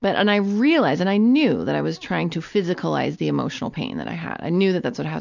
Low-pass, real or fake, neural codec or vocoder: 7.2 kHz; real; none